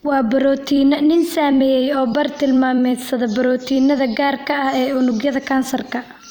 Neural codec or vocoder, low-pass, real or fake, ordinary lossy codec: vocoder, 44.1 kHz, 128 mel bands every 256 samples, BigVGAN v2; none; fake; none